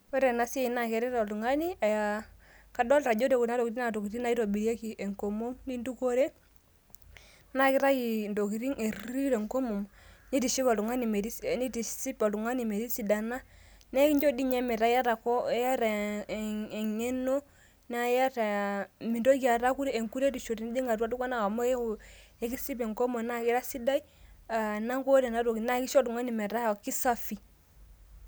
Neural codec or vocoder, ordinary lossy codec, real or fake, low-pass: none; none; real; none